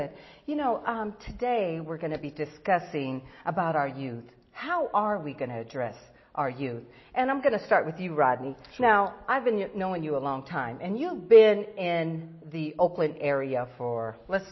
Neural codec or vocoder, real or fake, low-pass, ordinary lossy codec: none; real; 7.2 kHz; MP3, 24 kbps